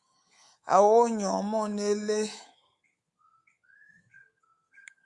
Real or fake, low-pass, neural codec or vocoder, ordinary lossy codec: fake; 10.8 kHz; codec, 24 kHz, 3.1 kbps, DualCodec; Opus, 64 kbps